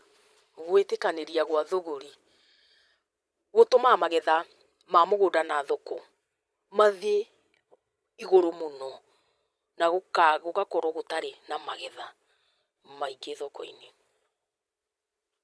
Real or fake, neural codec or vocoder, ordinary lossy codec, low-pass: fake; vocoder, 22.05 kHz, 80 mel bands, Vocos; none; none